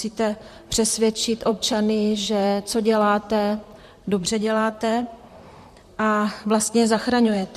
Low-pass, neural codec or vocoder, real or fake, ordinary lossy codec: 14.4 kHz; vocoder, 44.1 kHz, 128 mel bands, Pupu-Vocoder; fake; MP3, 64 kbps